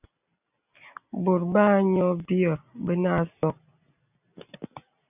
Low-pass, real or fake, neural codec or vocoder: 3.6 kHz; real; none